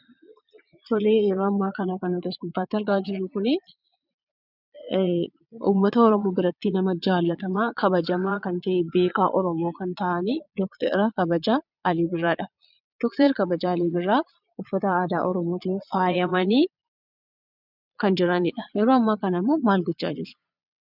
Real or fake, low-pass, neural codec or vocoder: fake; 5.4 kHz; vocoder, 22.05 kHz, 80 mel bands, Vocos